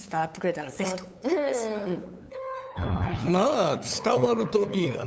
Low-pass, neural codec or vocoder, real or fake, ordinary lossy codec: none; codec, 16 kHz, 8 kbps, FunCodec, trained on LibriTTS, 25 frames a second; fake; none